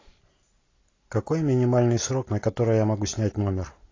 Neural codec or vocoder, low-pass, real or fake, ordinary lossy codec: none; 7.2 kHz; real; AAC, 32 kbps